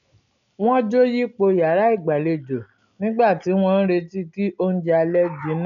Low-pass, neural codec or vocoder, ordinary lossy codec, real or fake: 7.2 kHz; none; none; real